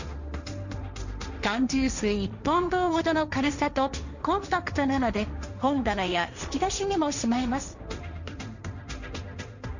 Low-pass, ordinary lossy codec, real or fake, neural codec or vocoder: 7.2 kHz; none; fake; codec, 16 kHz, 1.1 kbps, Voila-Tokenizer